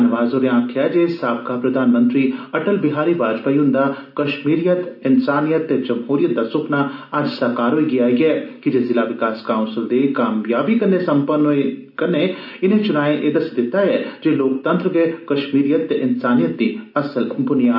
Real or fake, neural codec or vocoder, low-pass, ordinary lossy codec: real; none; 5.4 kHz; AAC, 48 kbps